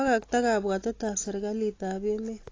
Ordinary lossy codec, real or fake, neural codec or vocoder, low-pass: AAC, 32 kbps; real; none; 7.2 kHz